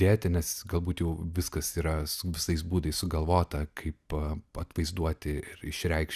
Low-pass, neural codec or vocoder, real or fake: 14.4 kHz; none; real